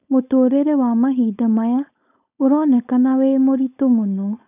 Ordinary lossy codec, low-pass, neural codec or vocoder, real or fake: none; 3.6 kHz; codec, 16 kHz, 4.8 kbps, FACodec; fake